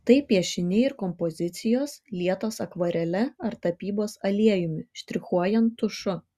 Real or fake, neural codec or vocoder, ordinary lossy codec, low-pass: real; none; Opus, 64 kbps; 14.4 kHz